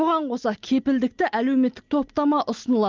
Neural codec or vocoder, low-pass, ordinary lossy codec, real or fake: none; 7.2 kHz; Opus, 24 kbps; real